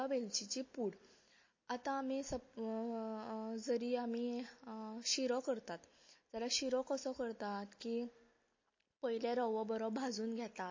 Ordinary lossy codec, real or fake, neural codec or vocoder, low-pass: MP3, 32 kbps; real; none; 7.2 kHz